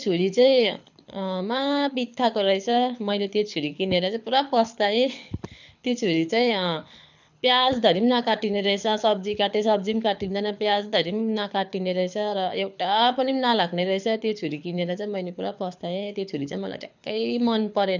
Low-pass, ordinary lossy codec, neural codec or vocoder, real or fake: 7.2 kHz; none; codec, 24 kHz, 6 kbps, HILCodec; fake